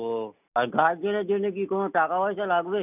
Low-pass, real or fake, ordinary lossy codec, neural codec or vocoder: 3.6 kHz; real; none; none